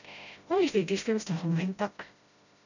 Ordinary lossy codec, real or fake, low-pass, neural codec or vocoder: none; fake; 7.2 kHz; codec, 16 kHz, 0.5 kbps, FreqCodec, smaller model